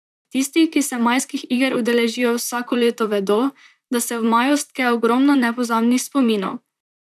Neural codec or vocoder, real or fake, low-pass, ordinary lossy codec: vocoder, 44.1 kHz, 128 mel bands, Pupu-Vocoder; fake; 14.4 kHz; none